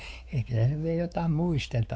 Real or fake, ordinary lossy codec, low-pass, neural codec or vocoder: fake; none; none; codec, 16 kHz, 4 kbps, X-Codec, WavLM features, trained on Multilingual LibriSpeech